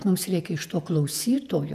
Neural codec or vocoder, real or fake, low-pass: vocoder, 48 kHz, 128 mel bands, Vocos; fake; 14.4 kHz